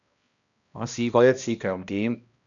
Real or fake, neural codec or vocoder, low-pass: fake; codec, 16 kHz, 1 kbps, X-Codec, HuBERT features, trained on balanced general audio; 7.2 kHz